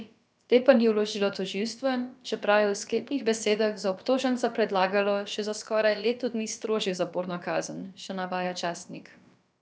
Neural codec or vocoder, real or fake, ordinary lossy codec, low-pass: codec, 16 kHz, about 1 kbps, DyCAST, with the encoder's durations; fake; none; none